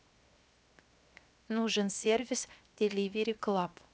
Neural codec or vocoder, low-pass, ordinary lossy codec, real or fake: codec, 16 kHz, 0.7 kbps, FocalCodec; none; none; fake